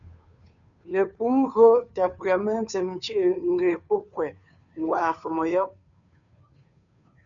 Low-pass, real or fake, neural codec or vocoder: 7.2 kHz; fake; codec, 16 kHz, 2 kbps, FunCodec, trained on Chinese and English, 25 frames a second